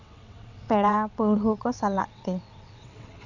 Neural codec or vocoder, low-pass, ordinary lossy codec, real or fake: vocoder, 22.05 kHz, 80 mel bands, WaveNeXt; 7.2 kHz; none; fake